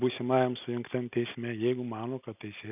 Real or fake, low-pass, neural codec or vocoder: real; 3.6 kHz; none